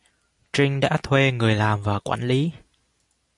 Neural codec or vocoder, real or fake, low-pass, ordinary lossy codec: none; real; 10.8 kHz; MP3, 96 kbps